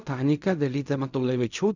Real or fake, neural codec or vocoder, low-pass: fake; codec, 16 kHz in and 24 kHz out, 0.4 kbps, LongCat-Audio-Codec, fine tuned four codebook decoder; 7.2 kHz